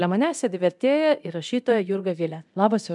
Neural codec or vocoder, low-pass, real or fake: codec, 24 kHz, 0.9 kbps, DualCodec; 10.8 kHz; fake